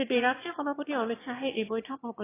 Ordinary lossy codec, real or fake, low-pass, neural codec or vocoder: AAC, 16 kbps; fake; 3.6 kHz; autoencoder, 22.05 kHz, a latent of 192 numbers a frame, VITS, trained on one speaker